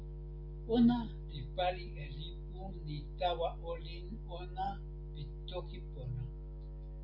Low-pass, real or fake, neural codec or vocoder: 5.4 kHz; real; none